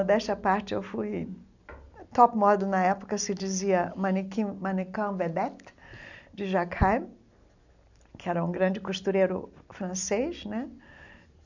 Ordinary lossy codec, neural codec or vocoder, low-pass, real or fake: none; none; 7.2 kHz; real